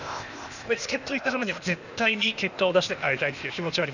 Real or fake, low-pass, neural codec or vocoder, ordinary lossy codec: fake; 7.2 kHz; codec, 16 kHz, 0.8 kbps, ZipCodec; none